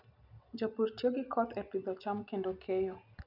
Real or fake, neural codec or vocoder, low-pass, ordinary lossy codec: real; none; 5.4 kHz; none